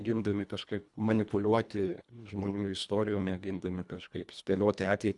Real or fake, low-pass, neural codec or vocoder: fake; 10.8 kHz; codec, 24 kHz, 1.5 kbps, HILCodec